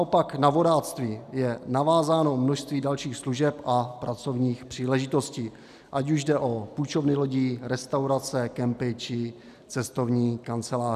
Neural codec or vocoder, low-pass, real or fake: none; 14.4 kHz; real